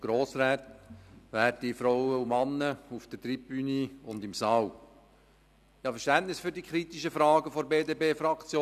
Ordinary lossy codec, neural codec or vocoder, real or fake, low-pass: none; none; real; 14.4 kHz